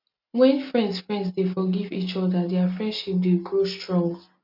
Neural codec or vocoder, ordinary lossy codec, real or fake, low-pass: none; MP3, 48 kbps; real; 5.4 kHz